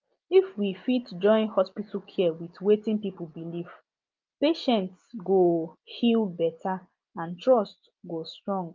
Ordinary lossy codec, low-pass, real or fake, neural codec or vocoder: Opus, 24 kbps; 7.2 kHz; real; none